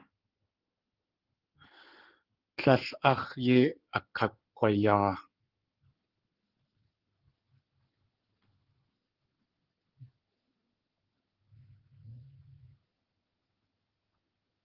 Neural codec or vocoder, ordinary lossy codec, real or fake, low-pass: codec, 16 kHz in and 24 kHz out, 2.2 kbps, FireRedTTS-2 codec; Opus, 16 kbps; fake; 5.4 kHz